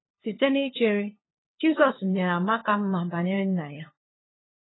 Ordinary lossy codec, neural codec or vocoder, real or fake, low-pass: AAC, 16 kbps; codec, 16 kHz, 2 kbps, FunCodec, trained on LibriTTS, 25 frames a second; fake; 7.2 kHz